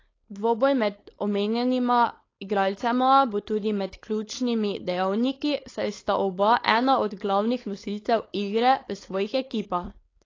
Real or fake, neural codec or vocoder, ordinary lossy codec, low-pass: fake; codec, 16 kHz, 4.8 kbps, FACodec; AAC, 32 kbps; 7.2 kHz